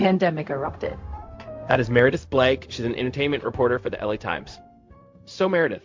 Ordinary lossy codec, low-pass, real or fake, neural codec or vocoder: MP3, 48 kbps; 7.2 kHz; fake; codec, 16 kHz, 0.4 kbps, LongCat-Audio-Codec